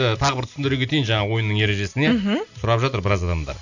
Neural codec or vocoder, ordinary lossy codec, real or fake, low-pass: none; none; real; 7.2 kHz